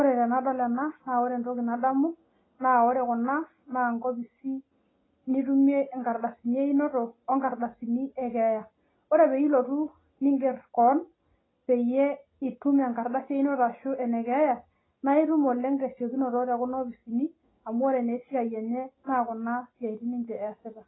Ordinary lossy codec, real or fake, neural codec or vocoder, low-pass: AAC, 16 kbps; real; none; 7.2 kHz